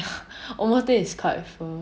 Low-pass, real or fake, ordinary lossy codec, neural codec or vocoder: none; real; none; none